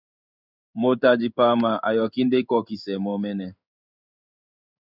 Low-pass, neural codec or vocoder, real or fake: 5.4 kHz; codec, 16 kHz in and 24 kHz out, 1 kbps, XY-Tokenizer; fake